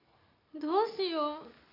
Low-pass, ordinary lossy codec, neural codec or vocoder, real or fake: 5.4 kHz; AAC, 32 kbps; none; real